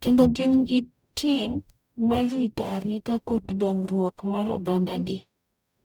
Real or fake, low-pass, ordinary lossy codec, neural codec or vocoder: fake; 19.8 kHz; none; codec, 44.1 kHz, 0.9 kbps, DAC